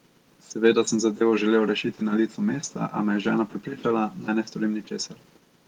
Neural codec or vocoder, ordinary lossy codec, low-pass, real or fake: none; Opus, 24 kbps; 19.8 kHz; real